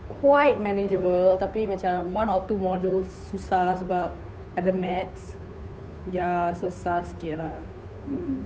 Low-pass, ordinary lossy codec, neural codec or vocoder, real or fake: none; none; codec, 16 kHz, 2 kbps, FunCodec, trained on Chinese and English, 25 frames a second; fake